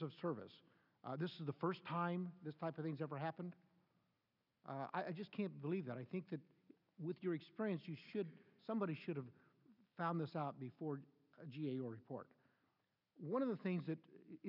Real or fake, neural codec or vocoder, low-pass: real; none; 5.4 kHz